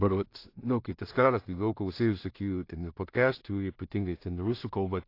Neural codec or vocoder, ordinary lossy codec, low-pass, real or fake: codec, 16 kHz in and 24 kHz out, 0.4 kbps, LongCat-Audio-Codec, two codebook decoder; AAC, 32 kbps; 5.4 kHz; fake